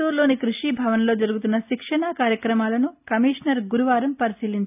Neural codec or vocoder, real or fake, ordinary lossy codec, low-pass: none; real; none; 3.6 kHz